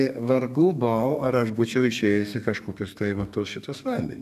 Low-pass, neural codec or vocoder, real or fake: 14.4 kHz; codec, 32 kHz, 1.9 kbps, SNAC; fake